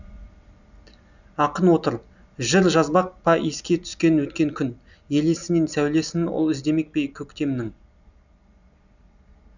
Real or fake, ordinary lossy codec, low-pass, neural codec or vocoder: real; none; 7.2 kHz; none